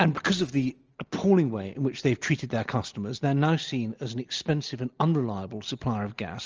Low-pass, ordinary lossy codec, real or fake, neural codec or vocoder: 7.2 kHz; Opus, 24 kbps; real; none